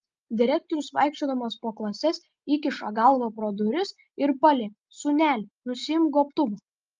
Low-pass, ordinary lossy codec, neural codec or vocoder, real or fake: 7.2 kHz; Opus, 24 kbps; none; real